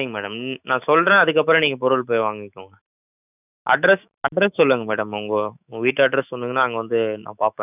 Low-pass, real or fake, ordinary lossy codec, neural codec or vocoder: 3.6 kHz; fake; none; autoencoder, 48 kHz, 128 numbers a frame, DAC-VAE, trained on Japanese speech